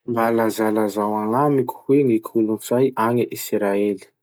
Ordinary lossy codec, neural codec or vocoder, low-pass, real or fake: none; none; none; real